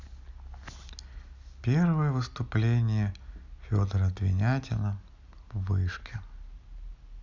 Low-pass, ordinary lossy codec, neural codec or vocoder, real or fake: 7.2 kHz; none; none; real